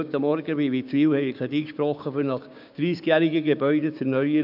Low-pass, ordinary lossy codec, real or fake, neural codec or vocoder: 5.4 kHz; none; fake; codec, 16 kHz, 6 kbps, DAC